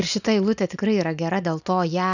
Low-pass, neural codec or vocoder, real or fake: 7.2 kHz; none; real